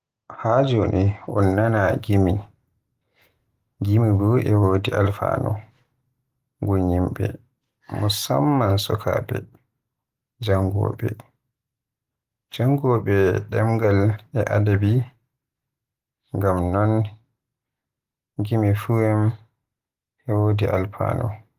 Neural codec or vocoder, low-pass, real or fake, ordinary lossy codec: none; 14.4 kHz; real; Opus, 24 kbps